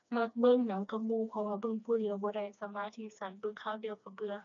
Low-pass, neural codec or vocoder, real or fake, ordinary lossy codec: 7.2 kHz; codec, 16 kHz, 2 kbps, FreqCodec, smaller model; fake; none